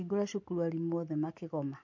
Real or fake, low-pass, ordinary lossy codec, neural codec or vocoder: real; 7.2 kHz; MP3, 48 kbps; none